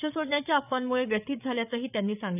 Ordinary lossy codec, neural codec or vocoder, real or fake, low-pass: none; codec, 16 kHz, 8 kbps, FreqCodec, larger model; fake; 3.6 kHz